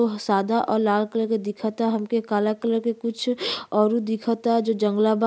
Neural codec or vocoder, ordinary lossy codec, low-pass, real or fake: none; none; none; real